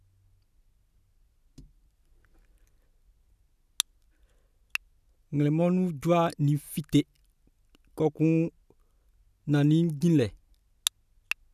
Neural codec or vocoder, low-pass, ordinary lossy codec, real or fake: none; 14.4 kHz; none; real